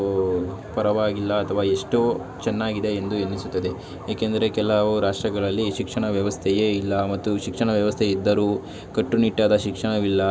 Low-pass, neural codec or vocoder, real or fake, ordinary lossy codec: none; none; real; none